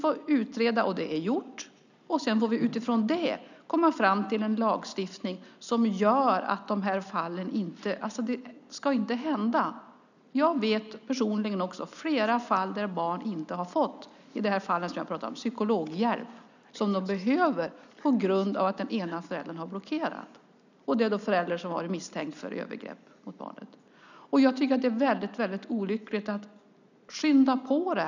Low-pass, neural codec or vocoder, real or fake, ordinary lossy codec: 7.2 kHz; none; real; none